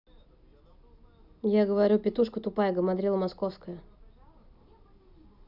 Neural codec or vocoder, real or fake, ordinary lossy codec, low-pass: none; real; none; 5.4 kHz